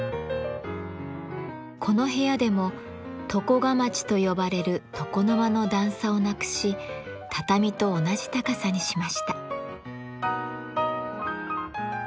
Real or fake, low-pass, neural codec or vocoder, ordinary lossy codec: real; none; none; none